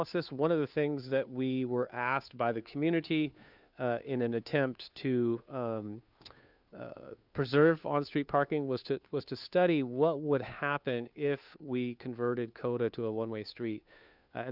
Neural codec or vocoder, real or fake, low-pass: codec, 16 kHz, 2 kbps, FunCodec, trained on Chinese and English, 25 frames a second; fake; 5.4 kHz